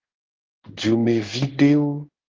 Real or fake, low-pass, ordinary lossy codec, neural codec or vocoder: fake; 7.2 kHz; Opus, 16 kbps; codec, 16 kHz in and 24 kHz out, 1 kbps, XY-Tokenizer